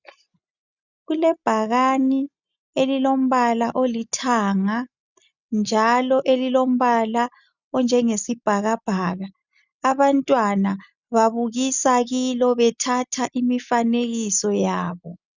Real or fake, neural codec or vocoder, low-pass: real; none; 7.2 kHz